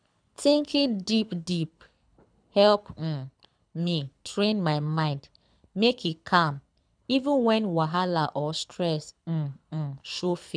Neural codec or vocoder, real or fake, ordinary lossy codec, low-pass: codec, 24 kHz, 6 kbps, HILCodec; fake; none; 9.9 kHz